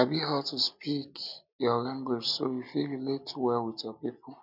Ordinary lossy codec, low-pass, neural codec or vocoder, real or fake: none; 5.4 kHz; none; real